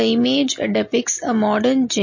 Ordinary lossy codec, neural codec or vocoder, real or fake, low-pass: MP3, 32 kbps; none; real; 7.2 kHz